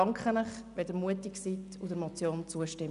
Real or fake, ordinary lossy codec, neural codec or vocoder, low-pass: real; none; none; 10.8 kHz